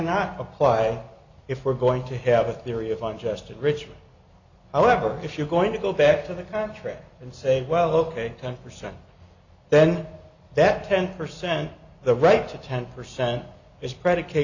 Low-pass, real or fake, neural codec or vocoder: 7.2 kHz; real; none